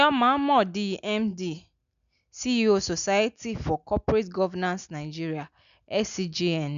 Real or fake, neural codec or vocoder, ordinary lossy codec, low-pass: real; none; none; 7.2 kHz